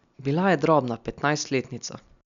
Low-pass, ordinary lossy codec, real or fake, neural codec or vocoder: 7.2 kHz; none; real; none